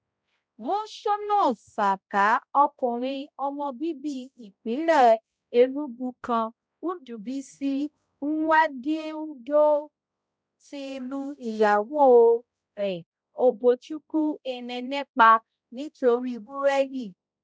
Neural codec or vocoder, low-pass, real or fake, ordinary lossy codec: codec, 16 kHz, 0.5 kbps, X-Codec, HuBERT features, trained on balanced general audio; none; fake; none